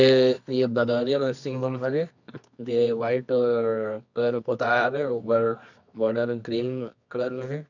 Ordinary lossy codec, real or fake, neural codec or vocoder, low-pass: none; fake; codec, 24 kHz, 0.9 kbps, WavTokenizer, medium music audio release; 7.2 kHz